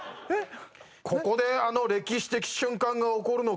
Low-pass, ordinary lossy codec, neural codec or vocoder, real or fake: none; none; none; real